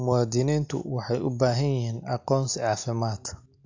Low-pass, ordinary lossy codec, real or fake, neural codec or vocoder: 7.2 kHz; AAC, 48 kbps; real; none